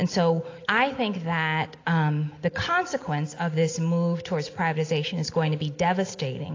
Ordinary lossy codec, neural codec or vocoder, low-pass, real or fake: AAC, 32 kbps; none; 7.2 kHz; real